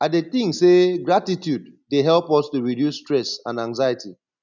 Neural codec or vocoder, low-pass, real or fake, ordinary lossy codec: none; 7.2 kHz; real; none